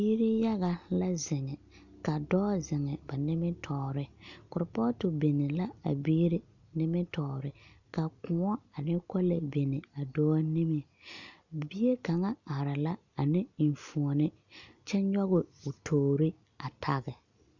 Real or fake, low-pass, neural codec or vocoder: real; 7.2 kHz; none